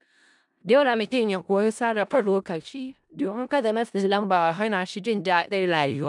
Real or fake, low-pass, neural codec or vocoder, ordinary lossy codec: fake; 10.8 kHz; codec, 16 kHz in and 24 kHz out, 0.4 kbps, LongCat-Audio-Codec, four codebook decoder; none